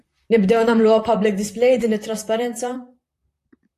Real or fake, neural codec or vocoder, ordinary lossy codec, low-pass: fake; vocoder, 44.1 kHz, 128 mel bands, Pupu-Vocoder; AAC, 64 kbps; 14.4 kHz